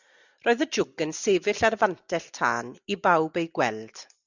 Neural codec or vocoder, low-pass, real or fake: none; 7.2 kHz; real